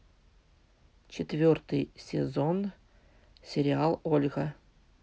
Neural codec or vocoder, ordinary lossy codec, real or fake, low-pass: none; none; real; none